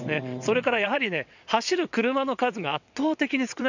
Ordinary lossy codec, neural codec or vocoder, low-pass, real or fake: none; vocoder, 22.05 kHz, 80 mel bands, WaveNeXt; 7.2 kHz; fake